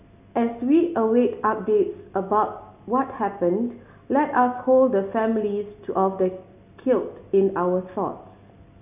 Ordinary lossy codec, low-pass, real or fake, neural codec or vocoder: none; 3.6 kHz; real; none